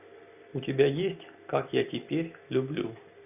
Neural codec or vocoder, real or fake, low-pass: none; real; 3.6 kHz